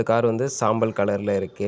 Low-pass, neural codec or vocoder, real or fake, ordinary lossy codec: none; none; real; none